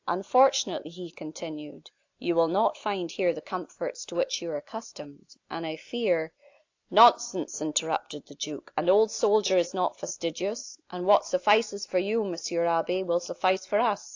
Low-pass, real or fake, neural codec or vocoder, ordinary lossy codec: 7.2 kHz; real; none; AAC, 48 kbps